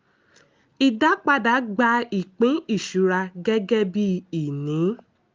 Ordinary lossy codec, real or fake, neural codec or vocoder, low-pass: Opus, 24 kbps; real; none; 7.2 kHz